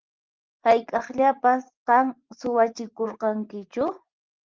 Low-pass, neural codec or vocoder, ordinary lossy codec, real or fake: 7.2 kHz; vocoder, 24 kHz, 100 mel bands, Vocos; Opus, 32 kbps; fake